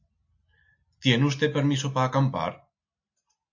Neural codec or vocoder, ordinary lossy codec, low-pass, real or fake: none; AAC, 48 kbps; 7.2 kHz; real